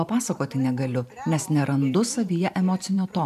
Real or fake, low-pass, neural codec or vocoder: fake; 14.4 kHz; vocoder, 44.1 kHz, 128 mel bands every 512 samples, BigVGAN v2